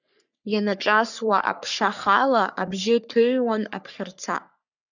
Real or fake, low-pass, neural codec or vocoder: fake; 7.2 kHz; codec, 44.1 kHz, 3.4 kbps, Pupu-Codec